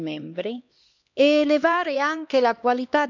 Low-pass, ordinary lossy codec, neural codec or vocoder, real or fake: 7.2 kHz; none; codec, 16 kHz, 2 kbps, X-Codec, HuBERT features, trained on LibriSpeech; fake